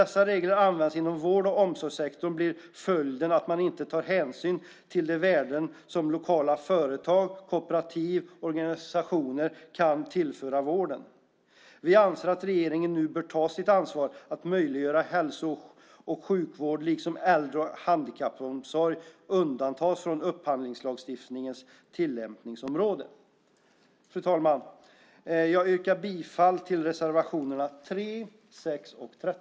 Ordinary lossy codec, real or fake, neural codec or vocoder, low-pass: none; real; none; none